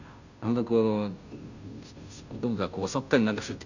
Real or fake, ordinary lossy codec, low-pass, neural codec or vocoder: fake; none; 7.2 kHz; codec, 16 kHz, 0.5 kbps, FunCodec, trained on Chinese and English, 25 frames a second